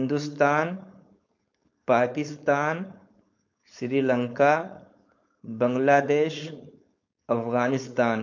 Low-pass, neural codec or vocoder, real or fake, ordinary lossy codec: 7.2 kHz; codec, 16 kHz, 4.8 kbps, FACodec; fake; MP3, 48 kbps